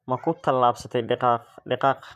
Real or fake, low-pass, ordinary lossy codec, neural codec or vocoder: fake; 14.4 kHz; none; vocoder, 44.1 kHz, 128 mel bands, Pupu-Vocoder